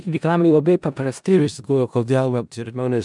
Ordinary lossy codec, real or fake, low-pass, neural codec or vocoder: MP3, 96 kbps; fake; 10.8 kHz; codec, 16 kHz in and 24 kHz out, 0.4 kbps, LongCat-Audio-Codec, four codebook decoder